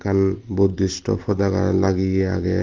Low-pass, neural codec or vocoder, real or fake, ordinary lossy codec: 7.2 kHz; none; real; Opus, 16 kbps